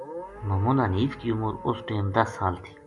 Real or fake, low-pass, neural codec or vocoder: real; 10.8 kHz; none